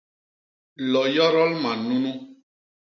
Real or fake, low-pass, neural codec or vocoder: real; 7.2 kHz; none